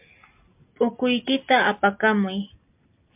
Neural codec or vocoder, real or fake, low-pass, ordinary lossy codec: none; real; 3.6 kHz; MP3, 32 kbps